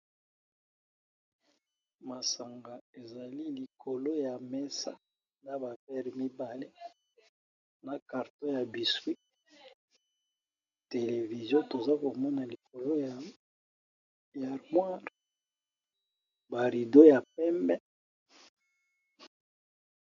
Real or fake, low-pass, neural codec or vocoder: real; 7.2 kHz; none